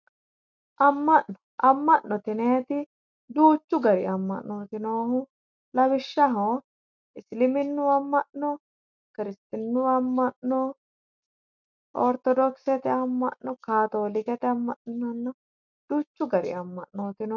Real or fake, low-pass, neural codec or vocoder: real; 7.2 kHz; none